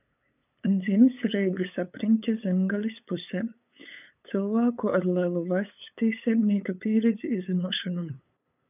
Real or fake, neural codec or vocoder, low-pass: fake; codec, 16 kHz, 8 kbps, FunCodec, trained on LibriTTS, 25 frames a second; 3.6 kHz